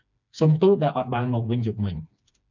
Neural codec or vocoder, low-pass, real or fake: codec, 16 kHz, 2 kbps, FreqCodec, smaller model; 7.2 kHz; fake